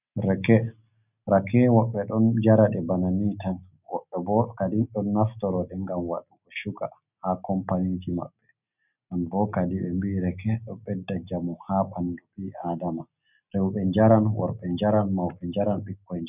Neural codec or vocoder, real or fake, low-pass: none; real; 3.6 kHz